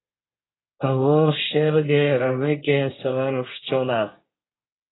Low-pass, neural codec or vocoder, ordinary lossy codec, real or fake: 7.2 kHz; codec, 24 kHz, 1 kbps, SNAC; AAC, 16 kbps; fake